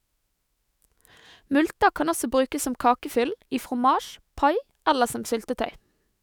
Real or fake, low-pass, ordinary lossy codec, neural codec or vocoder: fake; none; none; autoencoder, 48 kHz, 128 numbers a frame, DAC-VAE, trained on Japanese speech